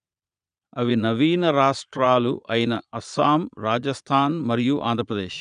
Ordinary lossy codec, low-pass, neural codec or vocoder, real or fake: none; 9.9 kHz; vocoder, 22.05 kHz, 80 mel bands, Vocos; fake